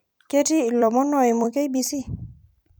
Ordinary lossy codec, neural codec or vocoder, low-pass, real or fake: none; vocoder, 44.1 kHz, 128 mel bands every 256 samples, BigVGAN v2; none; fake